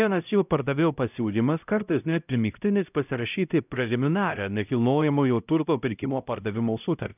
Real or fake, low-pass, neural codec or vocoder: fake; 3.6 kHz; codec, 16 kHz, 0.5 kbps, X-Codec, HuBERT features, trained on LibriSpeech